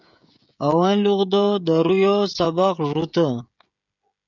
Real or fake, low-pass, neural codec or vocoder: fake; 7.2 kHz; codec, 16 kHz, 16 kbps, FreqCodec, smaller model